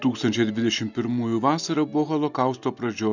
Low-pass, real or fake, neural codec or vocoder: 7.2 kHz; real; none